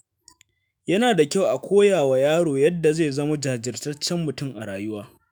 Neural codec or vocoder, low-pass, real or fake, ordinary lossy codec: autoencoder, 48 kHz, 128 numbers a frame, DAC-VAE, trained on Japanese speech; none; fake; none